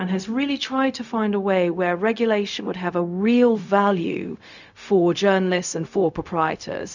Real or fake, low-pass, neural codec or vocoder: fake; 7.2 kHz; codec, 16 kHz, 0.4 kbps, LongCat-Audio-Codec